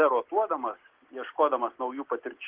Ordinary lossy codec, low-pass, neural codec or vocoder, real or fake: Opus, 16 kbps; 3.6 kHz; none; real